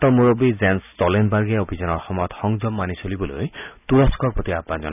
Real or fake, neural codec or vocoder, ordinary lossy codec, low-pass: real; none; none; 3.6 kHz